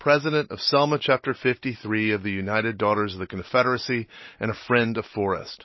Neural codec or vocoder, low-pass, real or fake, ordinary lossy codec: none; 7.2 kHz; real; MP3, 24 kbps